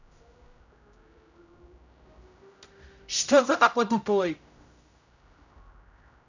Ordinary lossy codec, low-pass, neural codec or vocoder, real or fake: none; 7.2 kHz; codec, 16 kHz, 0.5 kbps, X-Codec, HuBERT features, trained on general audio; fake